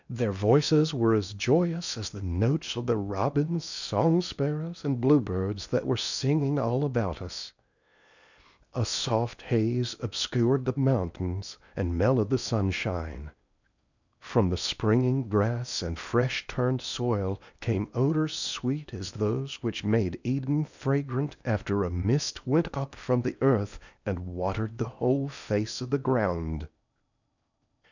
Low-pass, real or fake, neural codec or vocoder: 7.2 kHz; fake; codec, 16 kHz in and 24 kHz out, 0.8 kbps, FocalCodec, streaming, 65536 codes